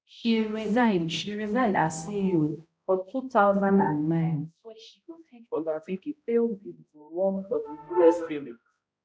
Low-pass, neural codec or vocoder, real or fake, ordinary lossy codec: none; codec, 16 kHz, 0.5 kbps, X-Codec, HuBERT features, trained on balanced general audio; fake; none